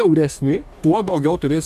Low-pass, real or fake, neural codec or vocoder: 14.4 kHz; fake; codec, 44.1 kHz, 2.6 kbps, DAC